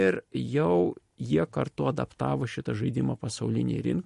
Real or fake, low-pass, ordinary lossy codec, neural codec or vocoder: real; 14.4 kHz; MP3, 48 kbps; none